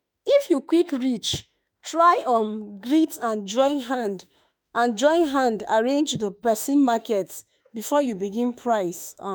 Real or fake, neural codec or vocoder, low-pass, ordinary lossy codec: fake; autoencoder, 48 kHz, 32 numbers a frame, DAC-VAE, trained on Japanese speech; none; none